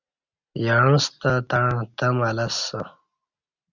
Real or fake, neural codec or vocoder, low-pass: real; none; 7.2 kHz